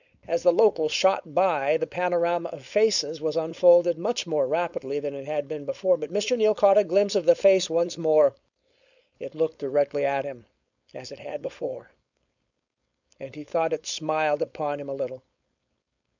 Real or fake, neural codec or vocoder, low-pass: fake; codec, 16 kHz, 4.8 kbps, FACodec; 7.2 kHz